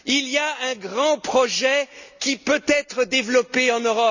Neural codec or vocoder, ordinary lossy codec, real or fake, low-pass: none; none; real; 7.2 kHz